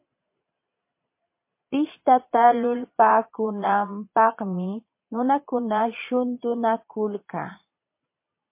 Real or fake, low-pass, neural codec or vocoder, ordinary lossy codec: fake; 3.6 kHz; vocoder, 22.05 kHz, 80 mel bands, Vocos; MP3, 24 kbps